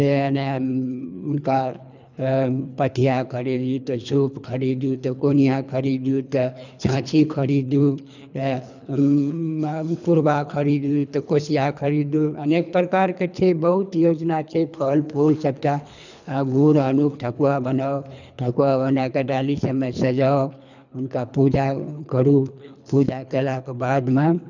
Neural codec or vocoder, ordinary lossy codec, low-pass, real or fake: codec, 24 kHz, 3 kbps, HILCodec; none; 7.2 kHz; fake